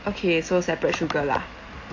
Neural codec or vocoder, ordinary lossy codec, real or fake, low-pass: none; AAC, 48 kbps; real; 7.2 kHz